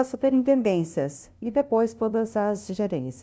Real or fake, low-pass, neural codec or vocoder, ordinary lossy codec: fake; none; codec, 16 kHz, 0.5 kbps, FunCodec, trained on LibriTTS, 25 frames a second; none